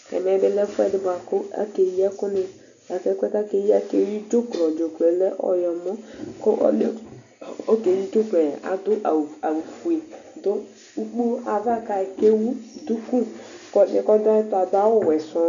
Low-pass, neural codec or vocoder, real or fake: 7.2 kHz; none; real